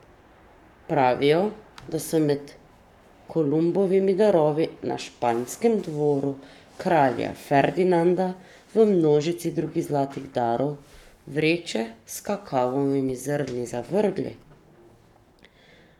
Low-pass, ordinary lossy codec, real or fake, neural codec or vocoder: 19.8 kHz; none; fake; codec, 44.1 kHz, 7.8 kbps, Pupu-Codec